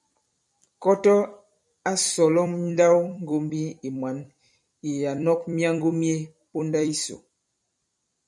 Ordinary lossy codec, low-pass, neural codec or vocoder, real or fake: MP3, 96 kbps; 10.8 kHz; vocoder, 44.1 kHz, 128 mel bands every 256 samples, BigVGAN v2; fake